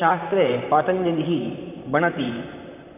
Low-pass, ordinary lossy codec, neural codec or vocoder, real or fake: 3.6 kHz; none; vocoder, 44.1 kHz, 128 mel bands, Pupu-Vocoder; fake